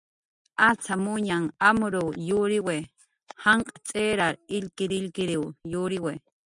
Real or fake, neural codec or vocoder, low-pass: real; none; 10.8 kHz